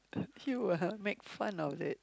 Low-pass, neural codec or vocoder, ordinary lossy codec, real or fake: none; none; none; real